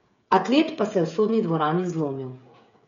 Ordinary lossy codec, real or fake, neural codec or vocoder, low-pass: MP3, 48 kbps; fake; codec, 16 kHz, 16 kbps, FreqCodec, smaller model; 7.2 kHz